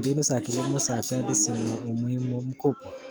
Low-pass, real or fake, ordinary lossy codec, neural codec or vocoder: none; fake; none; codec, 44.1 kHz, 7.8 kbps, DAC